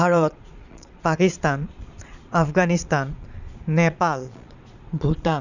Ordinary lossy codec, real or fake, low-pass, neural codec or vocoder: none; fake; 7.2 kHz; vocoder, 22.05 kHz, 80 mel bands, Vocos